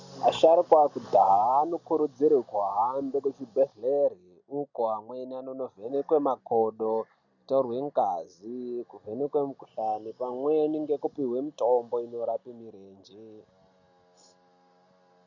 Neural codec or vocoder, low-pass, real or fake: none; 7.2 kHz; real